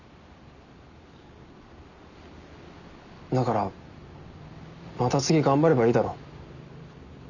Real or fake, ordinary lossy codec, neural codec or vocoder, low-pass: real; none; none; 7.2 kHz